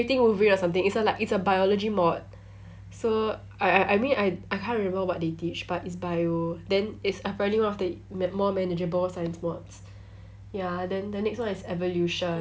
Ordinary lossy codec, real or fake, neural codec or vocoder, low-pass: none; real; none; none